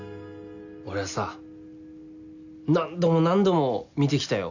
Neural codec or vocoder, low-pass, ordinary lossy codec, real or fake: none; 7.2 kHz; none; real